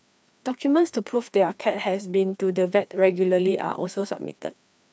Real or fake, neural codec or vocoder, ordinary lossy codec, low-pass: fake; codec, 16 kHz, 2 kbps, FreqCodec, larger model; none; none